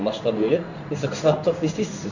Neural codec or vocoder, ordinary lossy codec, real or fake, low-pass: codec, 16 kHz in and 24 kHz out, 1 kbps, XY-Tokenizer; none; fake; 7.2 kHz